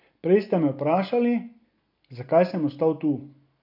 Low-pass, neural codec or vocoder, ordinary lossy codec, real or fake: 5.4 kHz; none; none; real